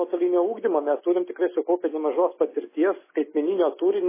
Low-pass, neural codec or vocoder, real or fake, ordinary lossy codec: 3.6 kHz; none; real; MP3, 16 kbps